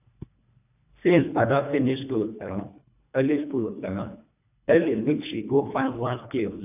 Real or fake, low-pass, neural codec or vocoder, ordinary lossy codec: fake; 3.6 kHz; codec, 24 kHz, 1.5 kbps, HILCodec; none